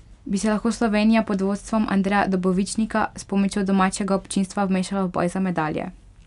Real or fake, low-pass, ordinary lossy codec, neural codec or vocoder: real; 10.8 kHz; none; none